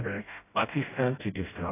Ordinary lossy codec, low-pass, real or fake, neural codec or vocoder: AAC, 16 kbps; 3.6 kHz; fake; codec, 16 kHz, 0.5 kbps, FreqCodec, smaller model